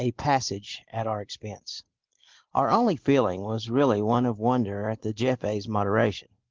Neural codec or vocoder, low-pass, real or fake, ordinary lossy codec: none; 7.2 kHz; real; Opus, 16 kbps